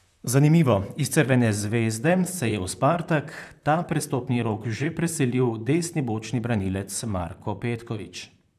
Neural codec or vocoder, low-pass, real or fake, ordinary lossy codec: vocoder, 44.1 kHz, 128 mel bands, Pupu-Vocoder; 14.4 kHz; fake; none